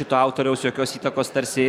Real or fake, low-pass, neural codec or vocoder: fake; 19.8 kHz; vocoder, 44.1 kHz, 128 mel bands every 512 samples, BigVGAN v2